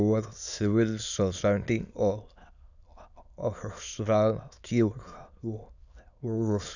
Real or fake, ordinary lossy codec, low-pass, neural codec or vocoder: fake; none; 7.2 kHz; autoencoder, 22.05 kHz, a latent of 192 numbers a frame, VITS, trained on many speakers